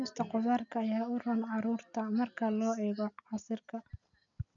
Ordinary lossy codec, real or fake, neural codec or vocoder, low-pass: none; real; none; 7.2 kHz